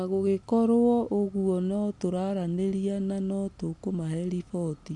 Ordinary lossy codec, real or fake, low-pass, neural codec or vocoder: none; real; 10.8 kHz; none